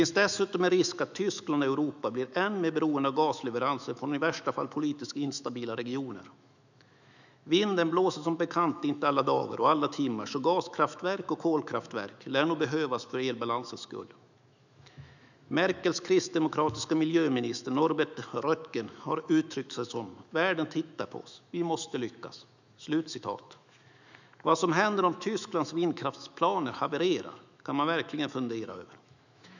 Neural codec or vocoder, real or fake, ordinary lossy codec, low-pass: autoencoder, 48 kHz, 128 numbers a frame, DAC-VAE, trained on Japanese speech; fake; none; 7.2 kHz